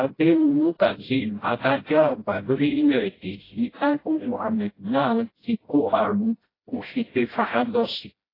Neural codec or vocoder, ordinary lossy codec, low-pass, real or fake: codec, 16 kHz, 0.5 kbps, FreqCodec, smaller model; AAC, 24 kbps; 5.4 kHz; fake